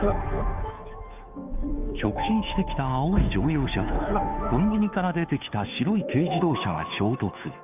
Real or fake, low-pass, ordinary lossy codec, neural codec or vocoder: fake; 3.6 kHz; none; codec, 16 kHz, 2 kbps, FunCodec, trained on Chinese and English, 25 frames a second